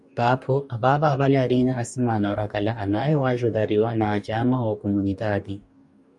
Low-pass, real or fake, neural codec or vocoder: 10.8 kHz; fake; codec, 44.1 kHz, 2.6 kbps, DAC